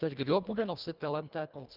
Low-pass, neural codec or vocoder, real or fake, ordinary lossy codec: 5.4 kHz; codec, 24 kHz, 1.5 kbps, HILCodec; fake; Opus, 24 kbps